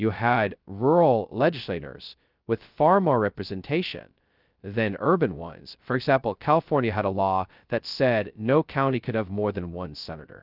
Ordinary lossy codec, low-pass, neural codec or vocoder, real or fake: Opus, 24 kbps; 5.4 kHz; codec, 16 kHz, 0.2 kbps, FocalCodec; fake